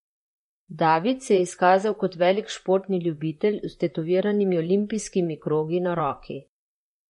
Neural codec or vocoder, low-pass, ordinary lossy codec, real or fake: vocoder, 44.1 kHz, 128 mel bands, Pupu-Vocoder; 19.8 kHz; MP3, 48 kbps; fake